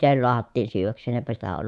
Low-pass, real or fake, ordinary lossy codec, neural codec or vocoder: none; fake; none; codec, 24 kHz, 3.1 kbps, DualCodec